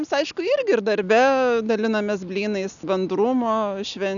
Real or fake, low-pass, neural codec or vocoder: real; 7.2 kHz; none